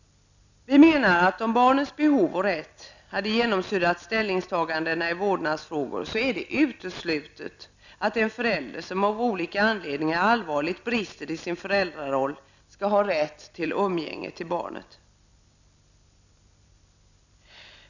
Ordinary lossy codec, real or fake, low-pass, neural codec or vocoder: none; real; 7.2 kHz; none